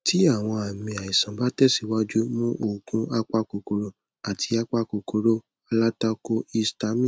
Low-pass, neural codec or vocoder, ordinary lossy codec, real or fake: none; none; none; real